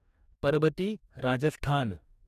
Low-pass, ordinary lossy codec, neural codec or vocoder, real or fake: 14.4 kHz; none; codec, 44.1 kHz, 2.6 kbps, DAC; fake